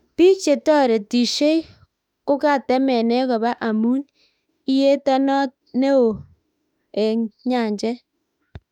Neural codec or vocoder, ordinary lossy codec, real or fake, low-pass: autoencoder, 48 kHz, 32 numbers a frame, DAC-VAE, trained on Japanese speech; none; fake; 19.8 kHz